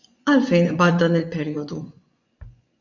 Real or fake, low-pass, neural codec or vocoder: fake; 7.2 kHz; vocoder, 24 kHz, 100 mel bands, Vocos